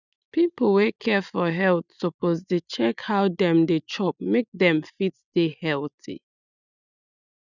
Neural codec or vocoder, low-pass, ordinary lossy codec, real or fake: none; 7.2 kHz; none; real